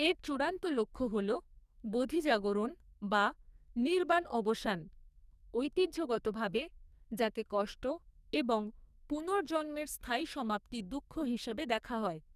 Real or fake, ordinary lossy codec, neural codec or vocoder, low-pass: fake; none; codec, 44.1 kHz, 2.6 kbps, SNAC; 14.4 kHz